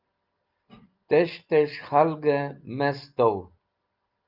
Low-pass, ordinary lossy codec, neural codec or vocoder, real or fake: 5.4 kHz; Opus, 24 kbps; none; real